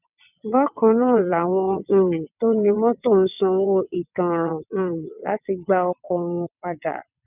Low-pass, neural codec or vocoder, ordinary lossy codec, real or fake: 3.6 kHz; vocoder, 22.05 kHz, 80 mel bands, WaveNeXt; none; fake